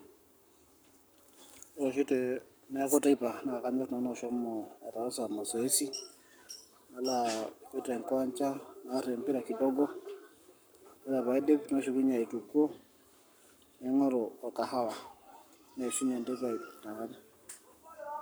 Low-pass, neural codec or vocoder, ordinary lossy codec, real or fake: none; codec, 44.1 kHz, 7.8 kbps, Pupu-Codec; none; fake